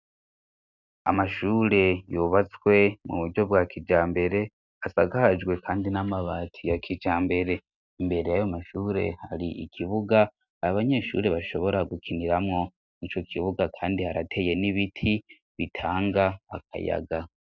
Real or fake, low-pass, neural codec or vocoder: real; 7.2 kHz; none